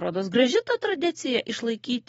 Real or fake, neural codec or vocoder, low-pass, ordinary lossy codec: fake; vocoder, 44.1 kHz, 128 mel bands every 512 samples, BigVGAN v2; 19.8 kHz; AAC, 24 kbps